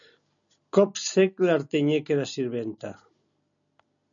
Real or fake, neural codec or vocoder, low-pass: real; none; 7.2 kHz